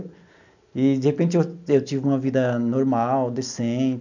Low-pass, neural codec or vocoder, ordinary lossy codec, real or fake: 7.2 kHz; none; none; real